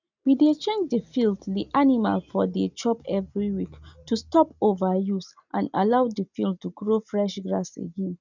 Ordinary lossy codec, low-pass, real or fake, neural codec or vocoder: none; 7.2 kHz; real; none